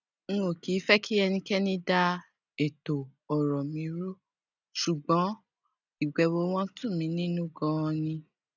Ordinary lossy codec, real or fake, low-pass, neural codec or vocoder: none; real; 7.2 kHz; none